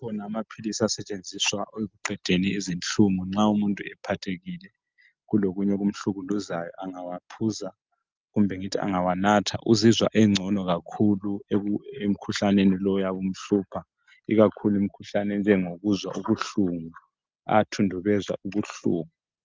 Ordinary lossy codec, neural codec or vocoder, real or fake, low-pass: Opus, 24 kbps; none; real; 7.2 kHz